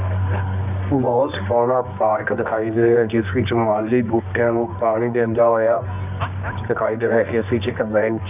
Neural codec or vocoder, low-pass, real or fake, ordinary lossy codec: codec, 24 kHz, 0.9 kbps, WavTokenizer, medium music audio release; 3.6 kHz; fake; none